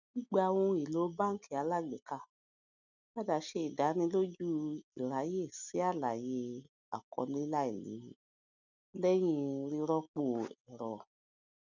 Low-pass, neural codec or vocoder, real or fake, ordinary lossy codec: 7.2 kHz; none; real; none